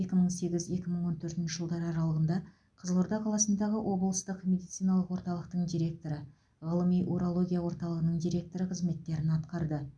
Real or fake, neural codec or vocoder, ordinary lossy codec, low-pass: real; none; none; 9.9 kHz